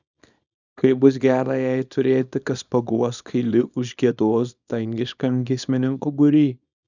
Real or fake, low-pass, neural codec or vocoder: fake; 7.2 kHz; codec, 24 kHz, 0.9 kbps, WavTokenizer, small release